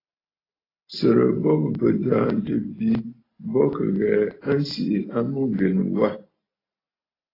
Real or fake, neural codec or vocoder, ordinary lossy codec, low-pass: real; none; AAC, 24 kbps; 5.4 kHz